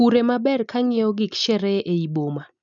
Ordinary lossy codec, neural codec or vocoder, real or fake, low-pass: none; none; real; 7.2 kHz